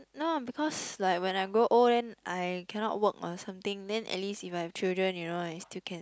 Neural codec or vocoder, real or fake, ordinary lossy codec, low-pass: none; real; none; none